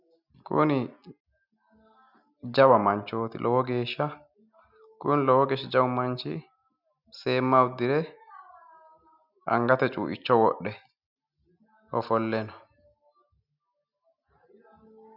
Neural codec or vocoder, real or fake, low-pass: none; real; 5.4 kHz